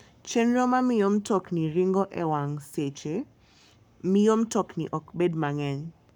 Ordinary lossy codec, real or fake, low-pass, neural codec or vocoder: none; fake; 19.8 kHz; codec, 44.1 kHz, 7.8 kbps, Pupu-Codec